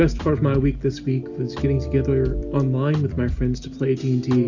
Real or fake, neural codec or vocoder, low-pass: real; none; 7.2 kHz